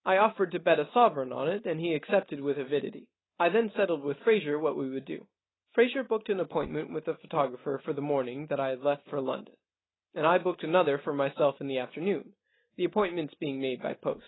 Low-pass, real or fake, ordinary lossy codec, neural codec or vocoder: 7.2 kHz; real; AAC, 16 kbps; none